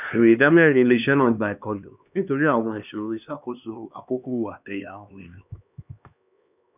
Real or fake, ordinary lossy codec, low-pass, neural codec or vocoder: fake; none; 3.6 kHz; codec, 16 kHz, 2 kbps, X-Codec, HuBERT features, trained on LibriSpeech